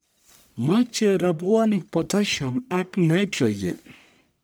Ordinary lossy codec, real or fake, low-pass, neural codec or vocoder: none; fake; none; codec, 44.1 kHz, 1.7 kbps, Pupu-Codec